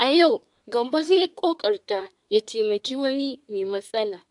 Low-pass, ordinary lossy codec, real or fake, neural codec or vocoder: 10.8 kHz; AAC, 64 kbps; fake; codec, 24 kHz, 1 kbps, SNAC